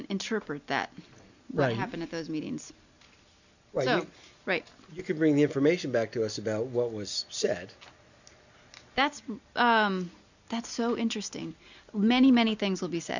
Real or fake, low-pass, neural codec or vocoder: real; 7.2 kHz; none